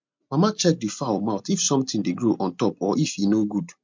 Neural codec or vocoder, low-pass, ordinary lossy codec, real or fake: none; 7.2 kHz; MP3, 64 kbps; real